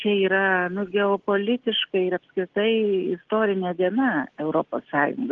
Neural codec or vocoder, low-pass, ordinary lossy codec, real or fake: none; 7.2 kHz; Opus, 16 kbps; real